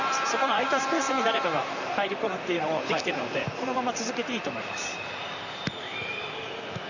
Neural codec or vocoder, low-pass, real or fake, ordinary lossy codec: vocoder, 44.1 kHz, 128 mel bands, Pupu-Vocoder; 7.2 kHz; fake; none